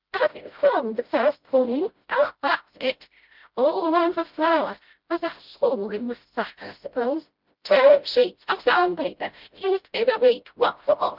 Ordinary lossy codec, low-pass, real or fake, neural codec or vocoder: Opus, 24 kbps; 5.4 kHz; fake; codec, 16 kHz, 0.5 kbps, FreqCodec, smaller model